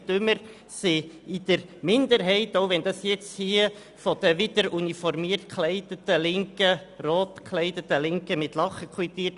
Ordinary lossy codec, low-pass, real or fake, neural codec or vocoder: none; 10.8 kHz; real; none